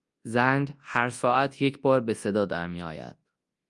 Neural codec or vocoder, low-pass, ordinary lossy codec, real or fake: codec, 24 kHz, 0.9 kbps, WavTokenizer, large speech release; 10.8 kHz; Opus, 32 kbps; fake